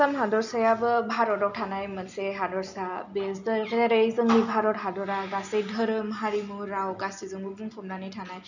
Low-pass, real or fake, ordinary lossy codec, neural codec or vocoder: 7.2 kHz; real; none; none